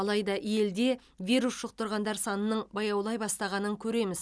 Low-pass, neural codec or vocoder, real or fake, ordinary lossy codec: none; none; real; none